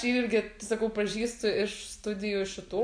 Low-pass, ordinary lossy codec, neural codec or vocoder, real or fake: 9.9 kHz; AAC, 64 kbps; none; real